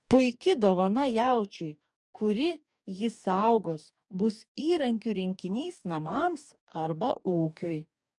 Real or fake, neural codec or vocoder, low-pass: fake; codec, 44.1 kHz, 2.6 kbps, DAC; 10.8 kHz